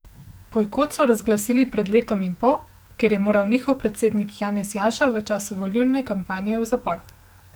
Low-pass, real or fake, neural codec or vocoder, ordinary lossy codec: none; fake; codec, 44.1 kHz, 2.6 kbps, SNAC; none